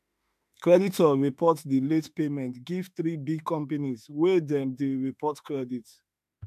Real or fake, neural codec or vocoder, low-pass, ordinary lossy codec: fake; autoencoder, 48 kHz, 32 numbers a frame, DAC-VAE, trained on Japanese speech; 14.4 kHz; MP3, 96 kbps